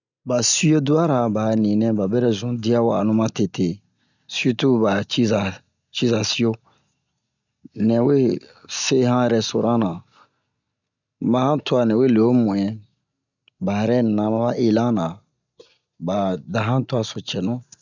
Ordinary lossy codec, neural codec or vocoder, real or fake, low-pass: none; none; real; 7.2 kHz